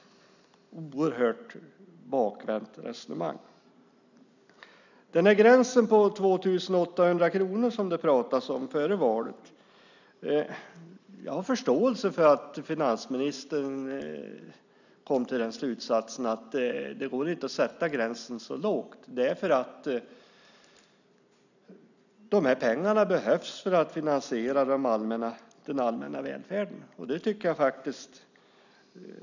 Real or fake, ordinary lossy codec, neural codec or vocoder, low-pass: real; none; none; 7.2 kHz